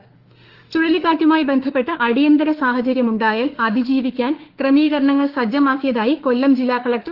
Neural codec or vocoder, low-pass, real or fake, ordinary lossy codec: autoencoder, 48 kHz, 32 numbers a frame, DAC-VAE, trained on Japanese speech; 5.4 kHz; fake; Opus, 16 kbps